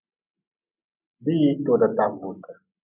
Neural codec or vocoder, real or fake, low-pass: none; real; 3.6 kHz